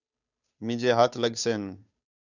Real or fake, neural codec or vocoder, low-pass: fake; codec, 16 kHz, 2 kbps, FunCodec, trained on Chinese and English, 25 frames a second; 7.2 kHz